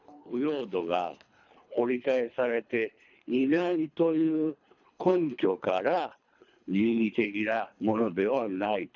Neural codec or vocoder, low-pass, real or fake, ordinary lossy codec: codec, 24 kHz, 3 kbps, HILCodec; 7.2 kHz; fake; none